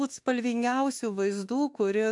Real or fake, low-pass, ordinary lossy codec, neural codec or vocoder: fake; 10.8 kHz; AAC, 64 kbps; autoencoder, 48 kHz, 32 numbers a frame, DAC-VAE, trained on Japanese speech